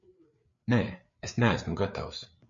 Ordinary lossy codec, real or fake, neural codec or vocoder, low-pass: MP3, 48 kbps; fake; codec, 16 kHz, 4 kbps, FreqCodec, larger model; 7.2 kHz